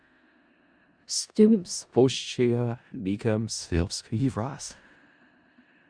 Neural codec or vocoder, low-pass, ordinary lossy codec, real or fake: codec, 16 kHz in and 24 kHz out, 0.4 kbps, LongCat-Audio-Codec, four codebook decoder; 9.9 kHz; Opus, 64 kbps; fake